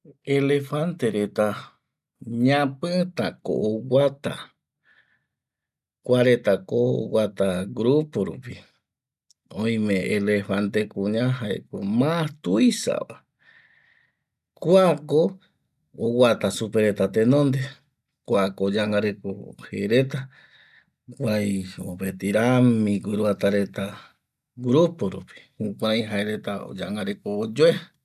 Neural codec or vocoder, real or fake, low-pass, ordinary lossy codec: none; real; 14.4 kHz; none